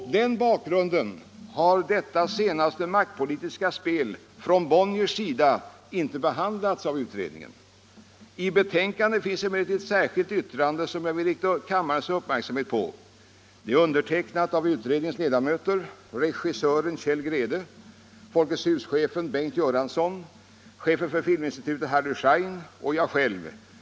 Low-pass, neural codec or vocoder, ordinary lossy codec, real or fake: none; none; none; real